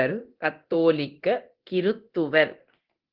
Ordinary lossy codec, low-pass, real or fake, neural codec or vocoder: Opus, 16 kbps; 5.4 kHz; fake; codec, 24 kHz, 0.9 kbps, DualCodec